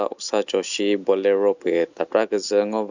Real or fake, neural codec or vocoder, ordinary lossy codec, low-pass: real; none; Opus, 64 kbps; 7.2 kHz